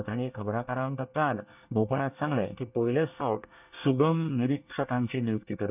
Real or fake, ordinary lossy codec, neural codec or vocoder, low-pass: fake; none; codec, 24 kHz, 1 kbps, SNAC; 3.6 kHz